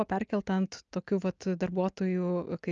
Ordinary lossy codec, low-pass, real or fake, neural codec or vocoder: Opus, 24 kbps; 7.2 kHz; real; none